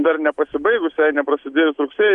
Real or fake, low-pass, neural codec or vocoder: real; 10.8 kHz; none